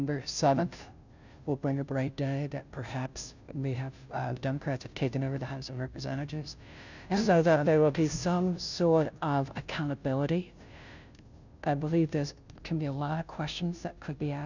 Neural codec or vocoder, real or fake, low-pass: codec, 16 kHz, 0.5 kbps, FunCodec, trained on Chinese and English, 25 frames a second; fake; 7.2 kHz